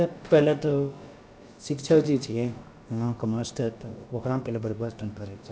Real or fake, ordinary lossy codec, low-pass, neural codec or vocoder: fake; none; none; codec, 16 kHz, about 1 kbps, DyCAST, with the encoder's durations